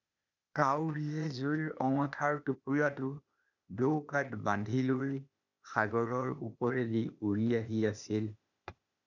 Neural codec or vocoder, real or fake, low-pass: codec, 16 kHz, 0.8 kbps, ZipCodec; fake; 7.2 kHz